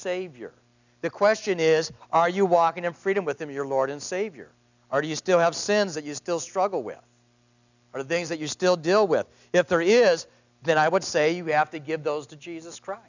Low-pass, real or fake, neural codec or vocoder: 7.2 kHz; real; none